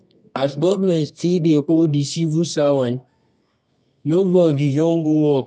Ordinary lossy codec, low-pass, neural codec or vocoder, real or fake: none; none; codec, 24 kHz, 0.9 kbps, WavTokenizer, medium music audio release; fake